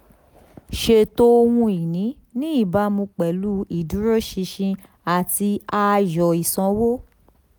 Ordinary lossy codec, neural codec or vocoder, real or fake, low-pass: none; none; real; none